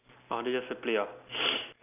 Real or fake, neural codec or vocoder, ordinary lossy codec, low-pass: real; none; none; 3.6 kHz